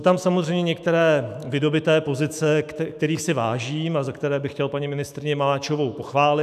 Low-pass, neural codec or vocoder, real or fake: 14.4 kHz; autoencoder, 48 kHz, 128 numbers a frame, DAC-VAE, trained on Japanese speech; fake